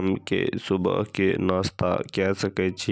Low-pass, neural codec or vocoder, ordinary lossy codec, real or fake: none; none; none; real